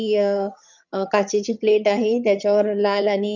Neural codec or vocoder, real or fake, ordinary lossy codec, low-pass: vocoder, 22.05 kHz, 80 mel bands, HiFi-GAN; fake; none; 7.2 kHz